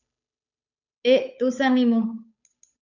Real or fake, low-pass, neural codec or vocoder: fake; 7.2 kHz; codec, 16 kHz, 8 kbps, FunCodec, trained on Chinese and English, 25 frames a second